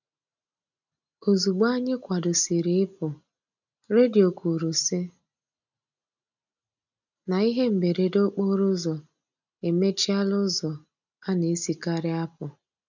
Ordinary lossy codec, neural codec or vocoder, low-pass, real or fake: none; none; 7.2 kHz; real